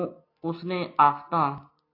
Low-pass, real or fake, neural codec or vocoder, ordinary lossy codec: 5.4 kHz; real; none; AAC, 32 kbps